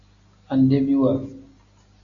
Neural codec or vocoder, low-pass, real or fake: none; 7.2 kHz; real